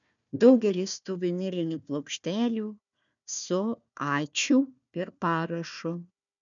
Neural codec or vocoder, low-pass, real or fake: codec, 16 kHz, 1 kbps, FunCodec, trained on Chinese and English, 50 frames a second; 7.2 kHz; fake